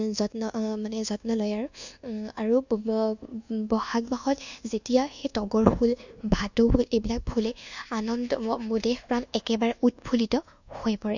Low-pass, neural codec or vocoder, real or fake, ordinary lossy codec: 7.2 kHz; codec, 24 kHz, 1.2 kbps, DualCodec; fake; none